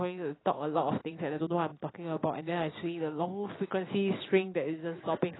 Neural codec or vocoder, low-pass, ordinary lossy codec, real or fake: none; 7.2 kHz; AAC, 16 kbps; real